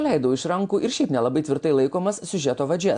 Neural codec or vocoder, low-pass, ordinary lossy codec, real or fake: none; 9.9 kHz; AAC, 64 kbps; real